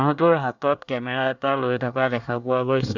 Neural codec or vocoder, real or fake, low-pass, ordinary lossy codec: codec, 24 kHz, 1 kbps, SNAC; fake; 7.2 kHz; none